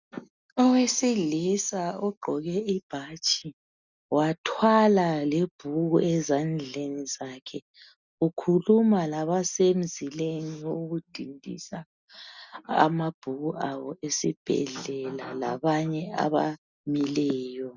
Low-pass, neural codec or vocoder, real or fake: 7.2 kHz; none; real